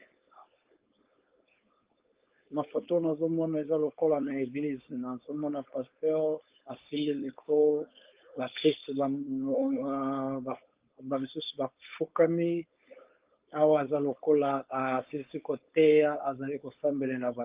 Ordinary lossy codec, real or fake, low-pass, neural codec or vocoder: Opus, 32 kbps; fake; 3.6 kHz; codec, 16 kHz, 4.8 kbps, FACodec